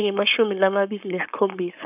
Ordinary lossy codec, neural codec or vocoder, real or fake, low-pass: AAC, 32 kbps; codec, 16 kHz, 4.8 kbps, FACodec; fake; 3.6 kHz